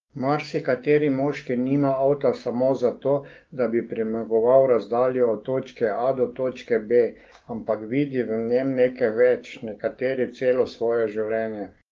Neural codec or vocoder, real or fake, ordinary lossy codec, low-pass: codec, 16 kHz, 6 kbps, DAC; fake; Opus, 24 kbps; 7.2 kHz